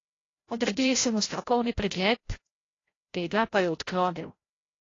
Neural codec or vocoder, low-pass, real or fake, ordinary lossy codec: codec, 16 kHz, 0.5 kbps, FreqCodec, larger model; 7.2 kHz; fake; AAC, 32 kbps